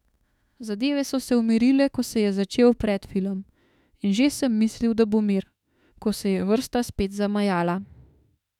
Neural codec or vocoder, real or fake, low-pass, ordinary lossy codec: autoencoder, 48 kHz, 32 numbers a frame, DAC-VAE, trained on Japanese speech; fake; 19.8 kHz; none